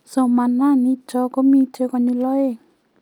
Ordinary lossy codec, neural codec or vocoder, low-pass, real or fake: none; none; 19.8 kHz; real